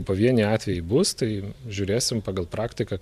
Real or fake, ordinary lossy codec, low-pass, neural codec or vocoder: fake; MP3, 96 kbps; 14.4 kHz; vocoder, 44.1 kHz, 128 mel bands every 512 samples, BigVGAN v2